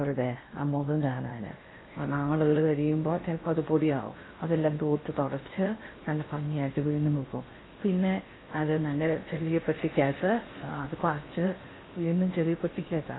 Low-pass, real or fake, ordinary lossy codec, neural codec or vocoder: 7.2 kHz; fake; AAC, 16 kbps; codec, 16 kHz in and 24 kHz out, 0.8 kbps, FocalCodec, streaming, 65536 codes